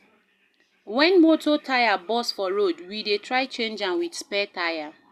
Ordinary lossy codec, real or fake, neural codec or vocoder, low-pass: Opus, 64 kbps; real; none; 14.4 kHz